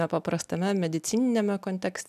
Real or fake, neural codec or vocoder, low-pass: real; none; 14.4 kHz